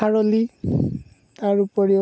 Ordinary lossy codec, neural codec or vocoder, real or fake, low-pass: none; none; real; none